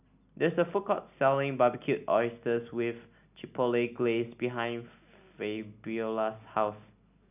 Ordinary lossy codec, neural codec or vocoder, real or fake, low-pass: none; none; real; 3.6 kHz